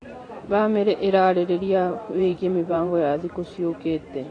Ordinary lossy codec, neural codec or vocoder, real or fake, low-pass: AAC, 32 kbps; vocoder, 44.1 kHz, 128 mel bands every 512 samples, BigVGAN v2; fake; 9.9 kHz